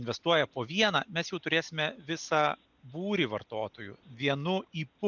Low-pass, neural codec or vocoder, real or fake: 7.2 kHz; none; real